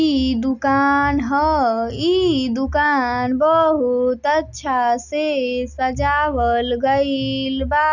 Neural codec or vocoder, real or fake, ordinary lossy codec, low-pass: none; real; none; 7.2 kHz